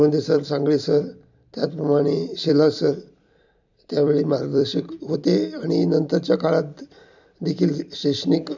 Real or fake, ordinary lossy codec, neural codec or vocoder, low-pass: fake; none; vocoder, 44.1 kHz, 128 mel bands every 256 samples, BigVGAN v2; 7.2 kHz